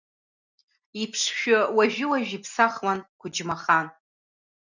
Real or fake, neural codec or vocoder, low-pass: real; none; 7.2 kHz